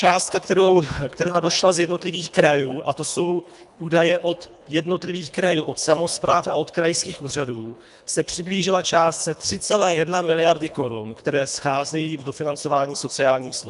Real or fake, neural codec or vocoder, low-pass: fake; codec, 24 kHz, 1.5 kbps, HILCodec; 10.8 kHz